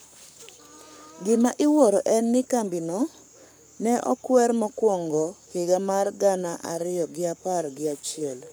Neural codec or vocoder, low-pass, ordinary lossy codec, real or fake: codec, 44.1 kHz, 7.8 kbps, Pupu-Codec; none; none; fake